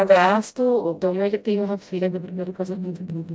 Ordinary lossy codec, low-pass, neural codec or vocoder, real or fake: none; none; codec, 16 kHz, 0.5 kbps, FreqCodec, smaller model; fake